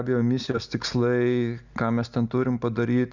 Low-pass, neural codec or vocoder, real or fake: 7.2 kHz; none; real